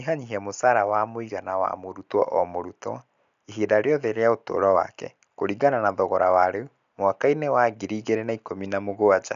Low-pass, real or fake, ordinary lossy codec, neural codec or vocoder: 7.2 kHz; real; none; none